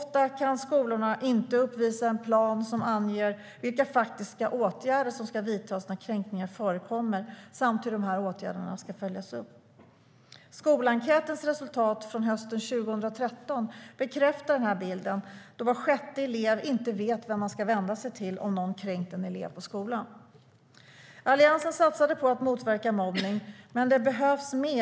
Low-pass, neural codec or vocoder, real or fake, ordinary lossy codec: none; none; real; none